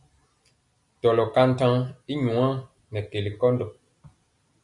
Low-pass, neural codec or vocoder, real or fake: 10.8 kHz; none; real